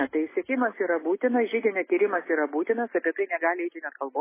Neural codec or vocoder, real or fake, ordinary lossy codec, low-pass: none; real; MP3, 16 kbps; 3.6 kHz